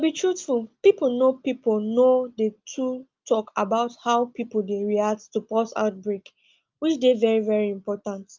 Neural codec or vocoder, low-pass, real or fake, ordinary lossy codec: none; 7.2 kHz; real; Opus, 24 kbps